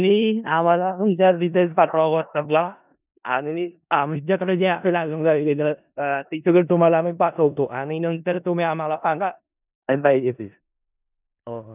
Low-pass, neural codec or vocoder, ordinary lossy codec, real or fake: 3.6 kHz; codec, 16 kHz in and 24 kHz out, 0.4 kbps, LongCat-Audio-Codec, four codebook decoder; none; fake